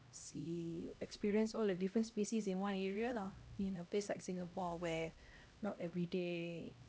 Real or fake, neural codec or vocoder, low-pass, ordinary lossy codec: fake; codec, 16 kHz, 1 kbps, X-Codec, HuBERT features, trained on LibriSpeech; none; none